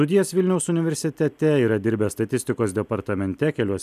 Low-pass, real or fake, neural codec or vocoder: 14.4 kHz; real; none